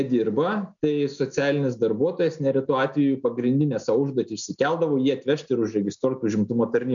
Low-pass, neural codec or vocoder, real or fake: 7.2 kHz; none; real